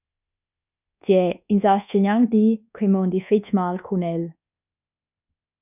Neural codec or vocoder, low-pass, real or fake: codec, 24 kHz, 1.2 kbps, DualCodec; 3.6 kHz; fake